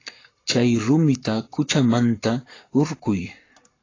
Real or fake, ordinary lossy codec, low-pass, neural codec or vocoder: fake; AAC, 32 kbps; 7.2 kHz; codec, 44.1 kHz, 7.8 kbps, Pupu-Codec